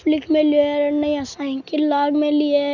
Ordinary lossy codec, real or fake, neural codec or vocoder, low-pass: none; real; none; 7.2 kHz